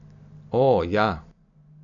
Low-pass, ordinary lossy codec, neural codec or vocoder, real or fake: 7.2 kHz; none; none; real